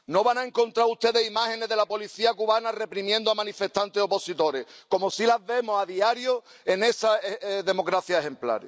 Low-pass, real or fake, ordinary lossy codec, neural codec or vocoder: none; real; none; none